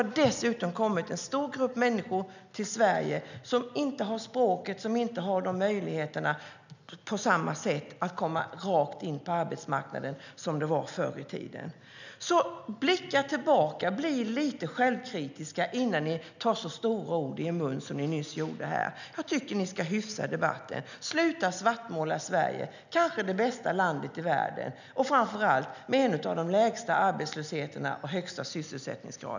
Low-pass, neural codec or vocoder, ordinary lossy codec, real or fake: 7.2 kHz; none; none; real